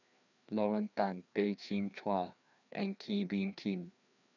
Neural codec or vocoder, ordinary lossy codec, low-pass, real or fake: codec, 16 kHz, 2 kbps, FreqCodec, larger model; none; 7.2 kHz; fake